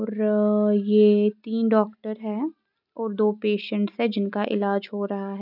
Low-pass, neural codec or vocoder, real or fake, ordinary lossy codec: 5.4 kHz; none; real; none